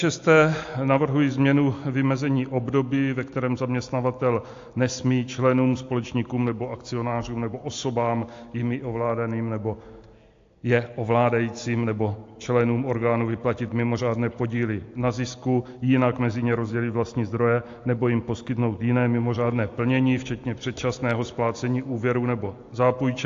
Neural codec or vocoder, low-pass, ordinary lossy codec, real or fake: none; 7.2 kHz; AAC, 48 kbps; real